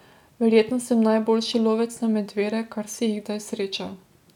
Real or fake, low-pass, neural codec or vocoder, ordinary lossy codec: real; 19.8 kHz; none; none